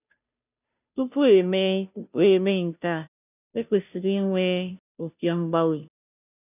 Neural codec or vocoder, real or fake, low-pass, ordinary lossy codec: codec, 16 kHz, 0.5 kbps, FunCodec, trained on Chinese and English, 25 frames a second; fake; 3.6 kHz; none